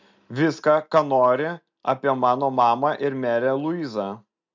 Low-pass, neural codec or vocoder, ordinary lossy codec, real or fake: 7.2 kHz; none; MP3, 64 kbps; real